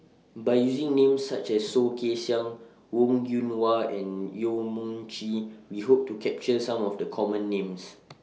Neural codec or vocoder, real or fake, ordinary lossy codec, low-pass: none; real; none; none